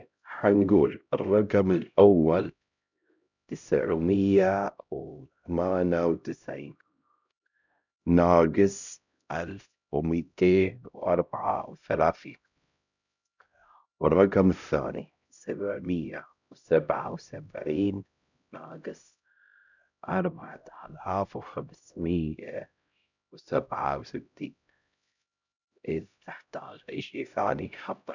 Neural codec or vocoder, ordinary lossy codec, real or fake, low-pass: codec, 16 kHz, 0.5 kbps, X-Codec, HuBERT features, trained on LibriSpeech; none; fake; 7.2 kHz